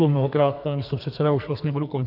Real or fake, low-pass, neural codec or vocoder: fake; 5.4 kHz; codec, 44.1 kHz, 2.6 kbps, SNAC